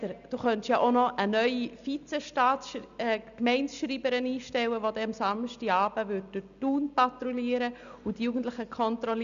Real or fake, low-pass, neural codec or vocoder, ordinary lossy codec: real; 7.2 kHz; none; none